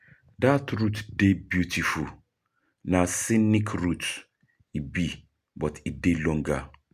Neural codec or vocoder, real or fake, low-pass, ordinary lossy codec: none; real; 14.4 kHz; none